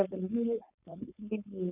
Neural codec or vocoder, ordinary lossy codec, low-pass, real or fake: codec, 16 kHz, 4.8 kbps, FACodec; none; 3.6 kHz; fake